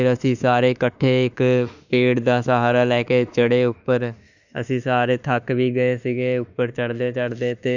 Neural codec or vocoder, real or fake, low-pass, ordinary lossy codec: autoencoder, 48 kHz, 32 numbers a frame, DAC-VAE, trained on Japanese speech; fake; 7.2 kHz; none